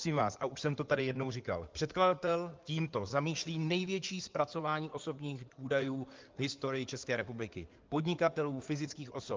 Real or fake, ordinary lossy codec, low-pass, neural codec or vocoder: fake; Opus, 24 kbps; 7.2 kHz; codec, 16 kHz in and 24 kHz out, 2.2 kbps, FireRedTTS-2 codec